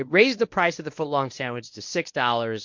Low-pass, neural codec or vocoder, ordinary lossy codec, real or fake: 7.2 kHz; codec, 24 kHz, 0.9 kbps, WavTokenizer, medium speech release version 1; MP3, 48 kbps; fake